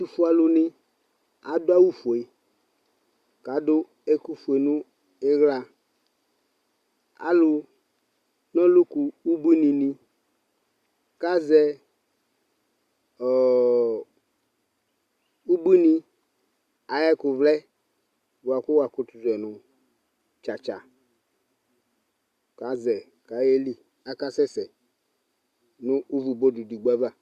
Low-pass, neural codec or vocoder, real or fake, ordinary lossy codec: 14.4 kHz; none; real; Opus, 64 kbps